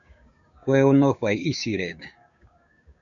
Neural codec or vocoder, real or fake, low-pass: codec, 16 kHz, 6 kbps, DAC; fake; 7.2 kHz